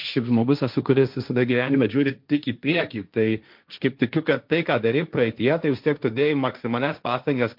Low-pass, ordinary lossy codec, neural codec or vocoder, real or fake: 5.4 kHz; MP3, 48 kbps; codec, 16 kHz, 1.1 kbps, Voila-Tokenizer; fake